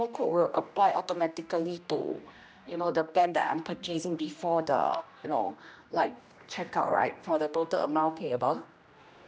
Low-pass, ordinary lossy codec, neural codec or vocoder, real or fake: none; none; codec, 16 kHz, 1 kbps, X-Codec, HuBERT features, trained on general audio; fake